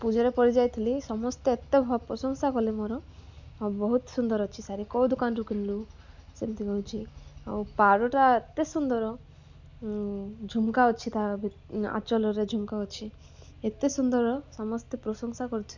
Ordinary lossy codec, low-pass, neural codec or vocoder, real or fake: none; 7.2 kHz; none; real